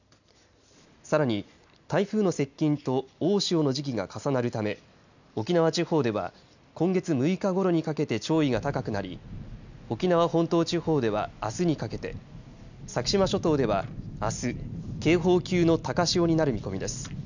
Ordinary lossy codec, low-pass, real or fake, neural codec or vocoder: none; 7.2 kHz; real; none